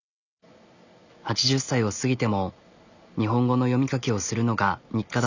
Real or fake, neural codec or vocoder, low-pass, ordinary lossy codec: real; none; 7.2 kHz; none